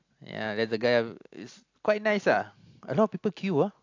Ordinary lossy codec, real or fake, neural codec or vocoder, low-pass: AAC, 48 kbps; real; none; 7.2 kHz